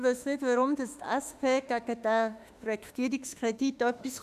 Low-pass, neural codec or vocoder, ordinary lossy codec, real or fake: 14.4 kHz; autoencoder, 48 kHz, 32 numbers a frame, DAC-VAE, trained on Japanese speech; none; fake